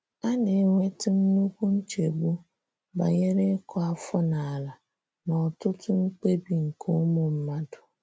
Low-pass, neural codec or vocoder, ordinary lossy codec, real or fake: none; none; none; real